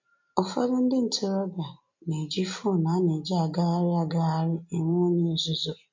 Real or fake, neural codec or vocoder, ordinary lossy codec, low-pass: real; none; MP3, 48 kbps; 7.2 kHz